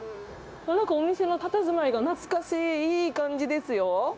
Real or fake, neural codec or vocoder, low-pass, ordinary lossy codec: fake; codec, 16 kHz, 0.9 kbps, LongCat-Audio-Codec; none; none